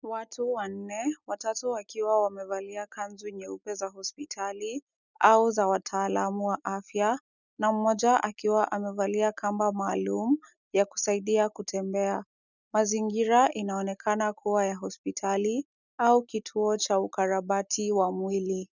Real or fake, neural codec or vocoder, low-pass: real; none; 7.2 kHz